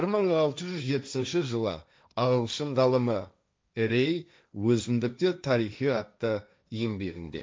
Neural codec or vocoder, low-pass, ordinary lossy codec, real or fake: codec, 16 kHz, 1.1 kbps, Voila-Tokenizer; none; none; fake